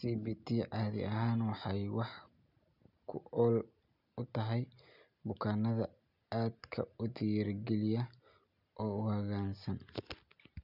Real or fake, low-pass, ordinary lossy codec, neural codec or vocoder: real; 5.4 kHz; none; none